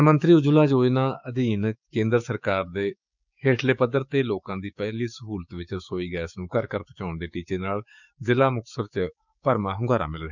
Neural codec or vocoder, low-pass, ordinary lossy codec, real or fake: codec, 16 kHz, 6 kbps, DAC; 7.2 kHz; none; fake